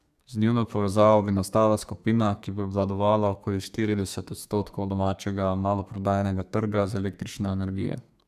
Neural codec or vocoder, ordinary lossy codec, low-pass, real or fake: codec, 32 kHz, 1.9 kbps, SNAC; none; 14.4 kHz; fake